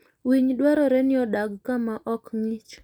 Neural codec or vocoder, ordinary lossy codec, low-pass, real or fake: none; none; 19.8 kHz; real